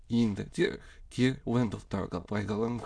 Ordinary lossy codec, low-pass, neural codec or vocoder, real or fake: none; 9.9 kHz; autoencoder, 22.05 kHz, a latent of 192 numbers a frame, VITS, trained on many speakers; fake